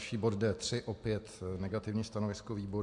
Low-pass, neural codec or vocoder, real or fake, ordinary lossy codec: 10.8 kHz; none; real; MP3, 64 kbps